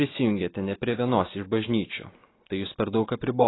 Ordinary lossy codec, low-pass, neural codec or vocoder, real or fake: AAC, 16 kbps; 7.2 kHz; none; real